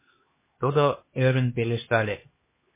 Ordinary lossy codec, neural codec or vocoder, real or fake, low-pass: MP3, 16 kbps; codec, 16 kHz, 2 kbps, X-Codec, HuBERT features, trained on LibriSpeech; fake; 3.6 kHz